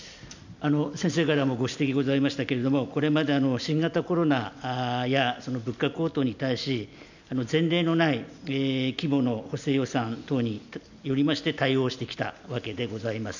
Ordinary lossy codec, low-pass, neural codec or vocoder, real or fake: none; 7.2 kHz; none; real